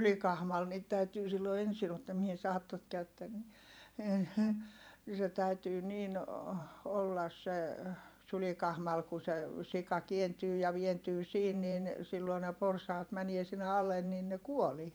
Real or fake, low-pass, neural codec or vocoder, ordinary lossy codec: fake; none; vocoder, 44.1 kHz, 128 mel bands every 512 samples, BigVGAN v2; none